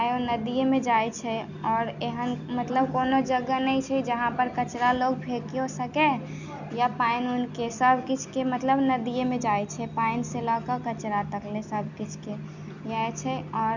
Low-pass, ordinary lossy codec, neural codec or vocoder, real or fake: 7.2 kHz; none; none; real